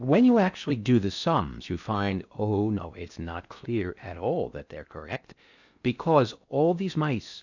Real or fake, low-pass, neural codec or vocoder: fake; 7.2 kHz; codec, 16 kHz in and 24 kHz out, 0.6 kbps, FocalCodec, streaming, 4096 codes